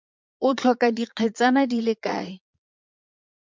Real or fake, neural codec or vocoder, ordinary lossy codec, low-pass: fake; vocoder, 22.05 kHz, 80 mel bands, Vocos; MP3, 64 kbps; 7.2 kHz